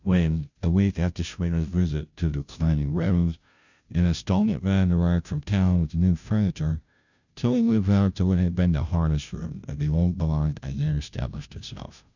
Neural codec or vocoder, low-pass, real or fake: codec, 16 kHz, 0.5 kbps, FunCodec, trained on Chinese and English, 25 frames a second; 7.2 kHz; fake